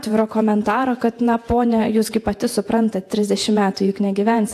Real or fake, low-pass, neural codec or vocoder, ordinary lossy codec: fake; 14.4 kHz; vocoder, 48 kHz, 128 mel bands, Vocos; AAC, 64 kbps